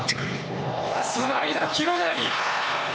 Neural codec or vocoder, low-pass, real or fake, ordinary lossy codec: codec, 16 kHz, 2 kbps, X-Codec, HuBERT features, trained on LibriSpeech; none; fake; none